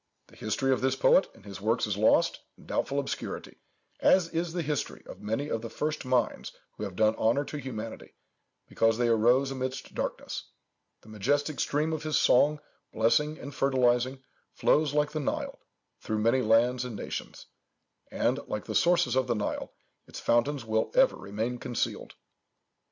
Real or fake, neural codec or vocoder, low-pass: real; none; 7.2 kHz